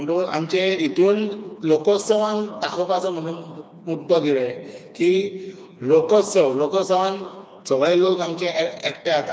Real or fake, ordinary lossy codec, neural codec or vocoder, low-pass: fake; none; codec, 16 kHz, 2 kbps, FreqCodec, smaller model; none